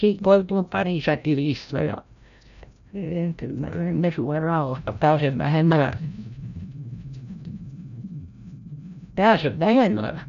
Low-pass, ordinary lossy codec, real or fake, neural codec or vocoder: 7.2 kHz; none; fake; codec, 16 kHz, 0.5 kbps, FreqCodec, larger model